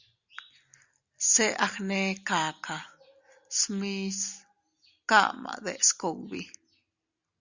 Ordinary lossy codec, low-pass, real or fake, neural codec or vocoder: Opus, 64 kbps; 7.2 kHz; real; none